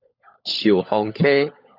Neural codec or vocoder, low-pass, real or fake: codec, 16 kHz, 16 kbps, FunCodec, trained on LibriTTS, 50 frames a second; 5.4 kHz; fake